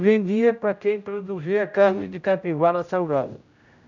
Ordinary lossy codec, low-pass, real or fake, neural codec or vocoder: none; 7.2 kHz; fake; codec, 16 kHz, 0.5 kbps, X-Codec, HuBERT features, trained on general audio